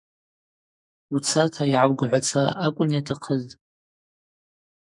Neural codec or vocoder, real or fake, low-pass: codec, 44.1 kHz, 2.6 kbps, SNAC; fake; 10.8 kHz